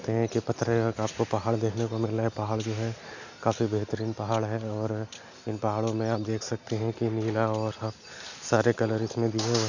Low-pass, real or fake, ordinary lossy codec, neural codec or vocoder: 7.2 kHz; real; none; none